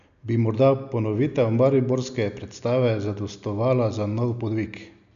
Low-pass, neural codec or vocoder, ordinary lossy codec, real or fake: 7.2 kHz; none; none; real